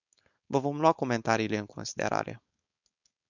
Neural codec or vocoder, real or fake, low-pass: codec, 16 kHz, 4.8 kbps, FACodec; fake; 7.2 kHz